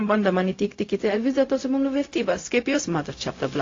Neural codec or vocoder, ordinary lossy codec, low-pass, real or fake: codec, 16 kHz, 0.4 kbps, LongCat-Audio-Codec; AAC, 32 kbps; 7.2 kHz; fake